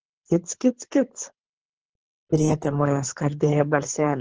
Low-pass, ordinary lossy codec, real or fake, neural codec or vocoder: 7.2 kHz; Opus, 16 kbps; fake; codec, 24 kHz, 3 kbps, HILCodec